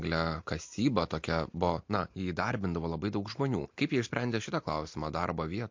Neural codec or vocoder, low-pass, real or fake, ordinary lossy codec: none; 7.2 kHz; real; MP3, 48 kbps